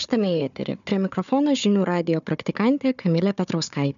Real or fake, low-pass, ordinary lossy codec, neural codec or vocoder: fake; 7.2 kHz; MP3, 96 kbps; codec, 16 kHz, 4 kbps, FunCodec, trained on Chinese and English, 50 frames a second